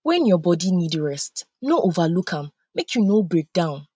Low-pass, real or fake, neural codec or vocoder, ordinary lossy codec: none; real; none; none